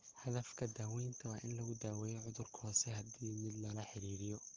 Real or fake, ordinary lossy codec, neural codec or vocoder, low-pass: real; Opus, 16 kbps; none; 7.2 kHz